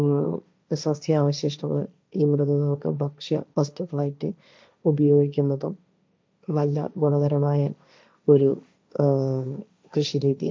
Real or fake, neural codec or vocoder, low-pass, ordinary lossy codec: fake; codec, 16 kHz, 1.1 kbps, Voila-Tokenizer; none; none